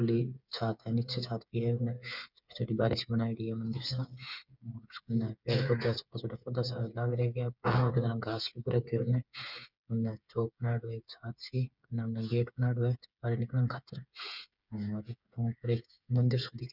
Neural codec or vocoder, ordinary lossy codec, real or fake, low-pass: codec, 16 kHz, 8 kbps, FreqCodec, smaller model; none; fake; 5.4 kHz